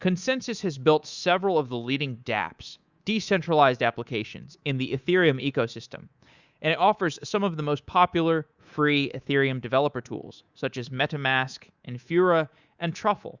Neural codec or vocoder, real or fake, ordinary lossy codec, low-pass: codec, 24 kHz, 3.1 kbps, DualCodec; fake; Opus, 64 kbps; 7.2 kHz